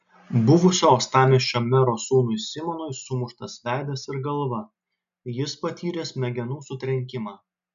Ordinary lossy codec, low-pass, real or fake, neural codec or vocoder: AAC, 96 kbps; 7.2 kHz; real; none